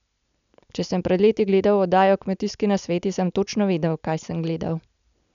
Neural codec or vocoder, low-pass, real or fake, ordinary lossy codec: none; 7.2 kHz; real; none